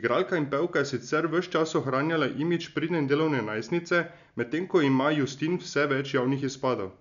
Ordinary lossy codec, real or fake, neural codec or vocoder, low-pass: none; real; none; 7.2 kHz